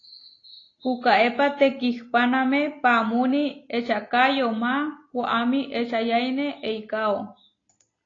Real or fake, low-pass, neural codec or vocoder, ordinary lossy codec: real; 7.2 kHz; none; AAC, 32 kbps